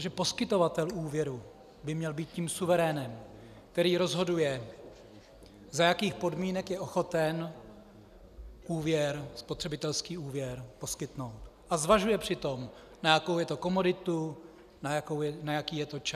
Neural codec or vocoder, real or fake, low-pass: none; real; 14.4 kHz